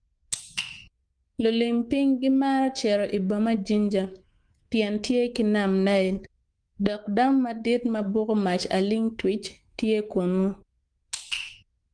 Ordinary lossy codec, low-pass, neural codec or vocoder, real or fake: Opus, 24 kbps; 9.9 kHz; codec, 24 kHz, 3.1 kbps, DualCodec; fake